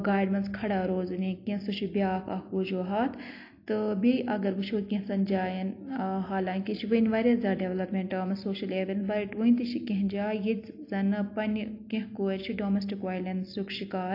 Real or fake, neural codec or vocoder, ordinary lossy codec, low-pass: real; none; AAC, 32 kbps; 5.4 kHz